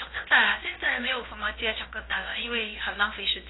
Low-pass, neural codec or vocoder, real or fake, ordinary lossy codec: 7.2 kHz; codec, 16 kHz, about 1 kbps, DyCAST, with the encoder's durations; fake; AAC, 16 kbps